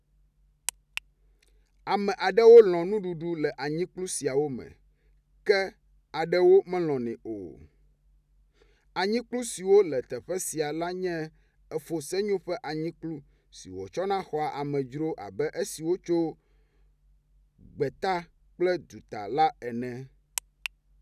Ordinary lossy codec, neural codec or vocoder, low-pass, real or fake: none; none; 14.4 kHz; real